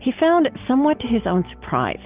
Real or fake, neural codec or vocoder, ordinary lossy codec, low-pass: real; none; Opus, 32 kbps; 3.6 kHz